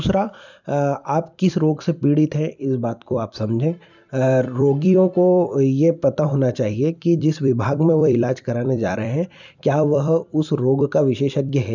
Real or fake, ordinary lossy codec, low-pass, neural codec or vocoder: fake; none; 7.2 kHz; vocoder, 44.1 kHz, 128 mel bands every 256 samples, BigVGAN v2